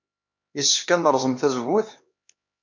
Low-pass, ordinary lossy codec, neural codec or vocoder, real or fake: 7.2 kHz; MP3, 48 kbps; codec, 16 kHz, 2 kbps, X-Codec, HuBERT features, trained on LibriSpeech; fake